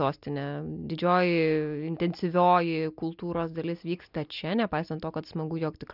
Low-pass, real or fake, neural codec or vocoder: 5.4 kHz; real; none